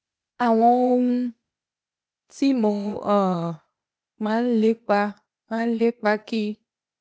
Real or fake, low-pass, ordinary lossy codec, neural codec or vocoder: fake; none; none; codec, 16 kHz, 0.8 kbps, ZipCodec